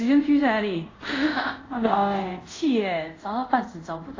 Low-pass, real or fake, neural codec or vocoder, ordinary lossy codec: 7.2 kHz; fake; codec, 24 kHz, 0.5 kbps, DualCodec; AAC, 32 kbps